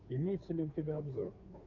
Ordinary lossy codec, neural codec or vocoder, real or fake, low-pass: AAC, 48 kbps; codec, 16 kHz, 2 kbps, FunCodec, trained on Chinese and English, 25 frames a second; fake; 7.2 kHz